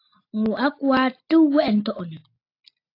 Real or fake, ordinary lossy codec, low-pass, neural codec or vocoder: real; AAC, 32 kbps; 5.4 kHz; none